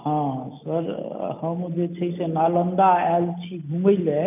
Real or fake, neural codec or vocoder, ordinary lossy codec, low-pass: real; none; none; 3.6 kHz